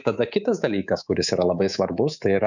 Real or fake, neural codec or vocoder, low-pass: fake; codec, 24 kHz, 3.1 kbps, DualCodec; 7.2 kHz